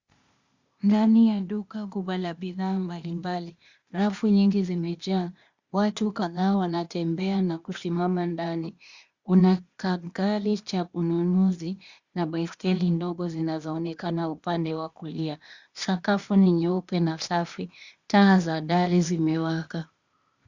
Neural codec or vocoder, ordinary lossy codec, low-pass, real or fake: codec, 16 kHz, 0.8 kbps, ZipCodec; Opus, 64 kbps; 7.2 kHz; fake